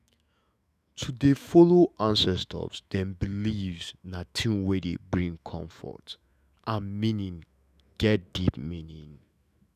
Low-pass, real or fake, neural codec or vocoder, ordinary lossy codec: 14.4 kHz; fake; autoencoder, 48 kHz, 128 numbers a frame, DAC-VAE, trained on Japanese speech; none